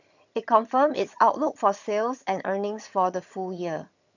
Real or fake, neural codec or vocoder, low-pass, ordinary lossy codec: fake; vocoder, 22.05 kHz, 80 mel bands, HiFi-GAN; 7.2 kHz; none